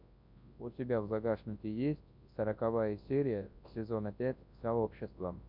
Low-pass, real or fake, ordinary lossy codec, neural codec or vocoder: 5.4 kHz; fake; MP3, 48 kbps; codec, 24 kHz, 0.9 kbps, WavTokenizer, large speech release